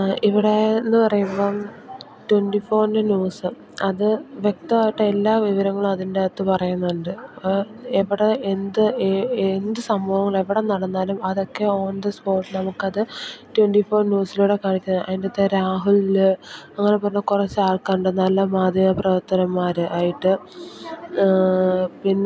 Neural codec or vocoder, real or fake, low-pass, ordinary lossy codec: none; real; none; none